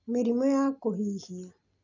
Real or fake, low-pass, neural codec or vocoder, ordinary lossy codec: real; 7.2 kHz; none; none